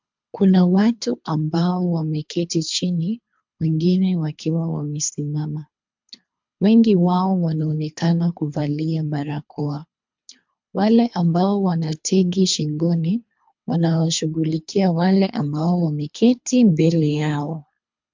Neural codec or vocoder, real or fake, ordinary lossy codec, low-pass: codec, 24 kHz, 3 kbps, HILCodec; fake; MP3, 64 kbps; 7.2 kHz